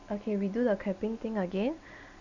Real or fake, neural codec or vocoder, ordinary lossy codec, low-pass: real; none; none; 7.2 kHz